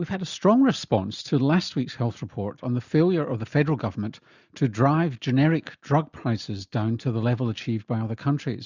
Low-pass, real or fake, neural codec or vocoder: 7.2 kHz; real; none